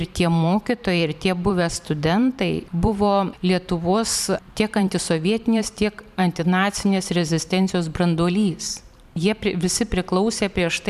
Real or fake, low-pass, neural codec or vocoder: fake; 14.4 kHz; vocoder, 44.1 kHz, 128 mel bands every 512 samples, BigVGAN v2